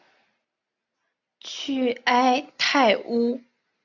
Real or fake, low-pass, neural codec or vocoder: real; 7.2 kHz; none